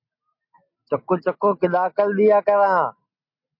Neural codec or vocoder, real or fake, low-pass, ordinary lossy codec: none; real; 5.4 kHz; MP3, 32 kbps